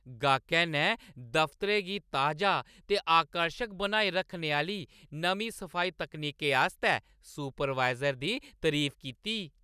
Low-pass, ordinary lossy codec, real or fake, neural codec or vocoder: 14.4 kHz; none; real; none